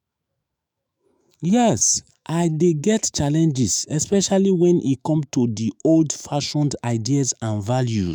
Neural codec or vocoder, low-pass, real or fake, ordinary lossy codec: autoencoder, 48 kHz, 128 numbers a frame, DAC-VAE, trained on Japanese speech; none; fake; none